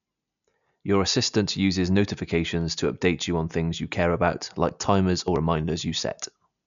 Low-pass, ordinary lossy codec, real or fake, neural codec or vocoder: 7.2 kHz; none; real; none